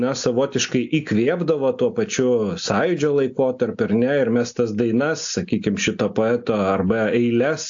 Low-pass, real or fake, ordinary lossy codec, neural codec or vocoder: 7.2 kHz; real; AAC, 64 kbps; none